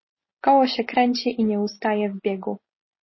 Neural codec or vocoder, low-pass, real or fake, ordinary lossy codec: none; 7.2 kHz; real; MP3, 24 kbps